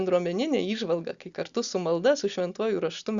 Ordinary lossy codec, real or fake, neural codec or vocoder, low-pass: Opus, 64 kbps; real; none; 7.2 kHz